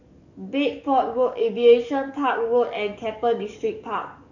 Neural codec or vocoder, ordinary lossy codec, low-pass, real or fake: codec, 44.1 kHz, 7.8 kbps, DAC; none; 7.2 kHz; fake